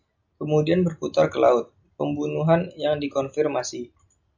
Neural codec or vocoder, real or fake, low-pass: none; real; 7.2 kHz